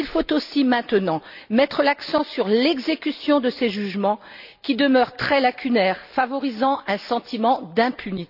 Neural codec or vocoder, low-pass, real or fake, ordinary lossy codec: none; 5.4 kHz; real; none